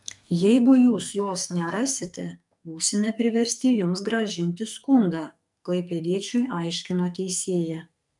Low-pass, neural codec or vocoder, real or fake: 10.8 kHz; codec, 44.1 kHz, 2.6 kbps, SNAC; fake